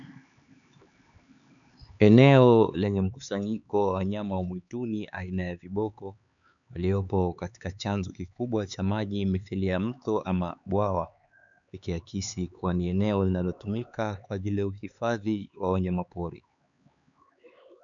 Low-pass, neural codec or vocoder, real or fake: 7.2 kHz; codec, 16 kHz, 4 kbps, X-Codec, HuBERT features, trained on LibriSpeech; fake